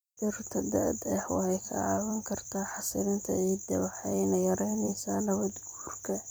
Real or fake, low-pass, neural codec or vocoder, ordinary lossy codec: fake; none; vocoder, 44.1 kHz, 128 mel bands every 256 samples, BigVGAN v2; none